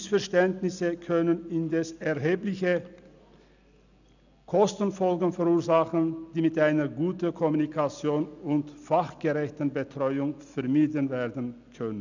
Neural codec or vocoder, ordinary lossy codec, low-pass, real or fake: none; none; 7.2 kHz; real